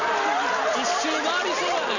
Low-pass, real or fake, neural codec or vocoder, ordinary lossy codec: 7.2 kHz; real; none; none